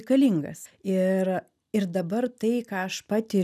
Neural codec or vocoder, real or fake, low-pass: none; real; 14.4 kHz